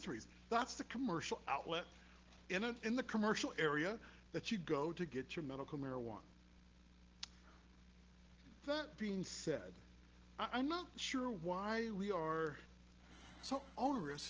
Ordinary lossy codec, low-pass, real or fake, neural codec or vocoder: Opus, 16 kbps; 7.2 kHz; real; none